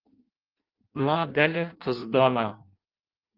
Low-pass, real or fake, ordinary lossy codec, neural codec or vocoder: 5.4 kHz; fake; Opus, 32 kbps; codec, 16 kHz in and 24 kHz out, 0.6 kbps, FireRedTTS-2 codec